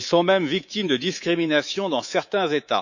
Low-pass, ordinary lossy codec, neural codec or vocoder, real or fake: 7.2 kHz; none; codec, 24 kHz, 3.1 kbps, DualCodec; fake